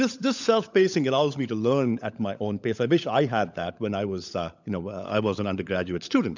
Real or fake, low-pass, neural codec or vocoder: fake; 7.2 kHz; codec, 16 kHz, 16 kbps, FunCodec, trained on LibriTTS, 50 frames a second